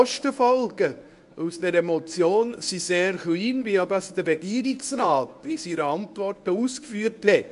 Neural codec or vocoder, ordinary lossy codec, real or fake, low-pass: codec, 24 kHz, 0.9 kbps, WavTokenizer, medium speech release version 1; AAC, 96 kbps; fake; 10.8 kHz